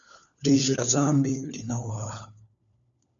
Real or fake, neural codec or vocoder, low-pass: fake; codec, 16 kHz, 4 kbps, FunCodec, trained on LibriTTS, 50 frames a second; 7.2 kHz